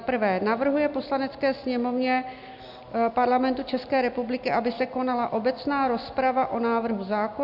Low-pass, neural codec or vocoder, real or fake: 5.4 kHz; none; real